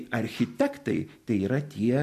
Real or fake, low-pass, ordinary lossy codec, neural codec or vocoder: real; 14.4 kHz; MP3, 64 kbps; none